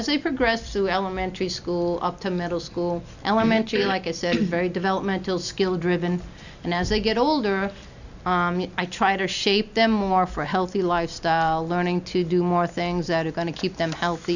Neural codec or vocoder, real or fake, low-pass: none; real; 7.2 kHz